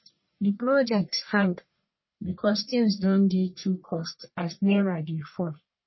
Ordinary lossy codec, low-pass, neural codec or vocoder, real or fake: MP3, 24 kbps; 7.2 kHz; codec, 44.1 kHz, 1.7 kbps, Pupu-Codec; fake